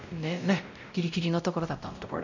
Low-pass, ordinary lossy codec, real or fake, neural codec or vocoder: 7.2 kHz; none; fake; codec, 16 kHz, 0.5 kbps, X-Codec, WavLM features, trained on Multilingual LibriSpeech